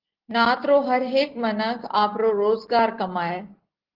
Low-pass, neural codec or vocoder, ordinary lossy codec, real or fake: 5.4 kHz; none; Opus, 16 kbps; real